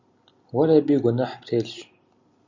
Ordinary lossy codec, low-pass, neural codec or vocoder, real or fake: Opus, 64 kbps; 7.2 kHz; none; real